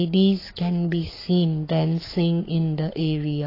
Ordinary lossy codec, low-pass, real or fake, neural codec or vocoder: AAC, 24 kbps; 5.4 kHz; fake; codec, 44.1 kHz, 7.8 kbps, Pupu-Codec